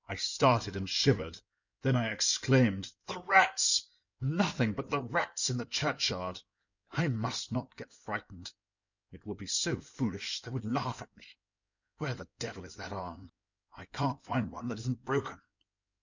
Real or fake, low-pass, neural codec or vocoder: fake; 7.2 kHz; codec, 16 kHz in and 24 kHz out, 2.2 kbps, FireRedTTS-2 codec